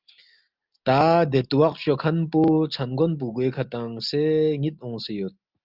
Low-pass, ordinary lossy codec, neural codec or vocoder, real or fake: 5.4 kHz; Opus, 24 kbps; none; real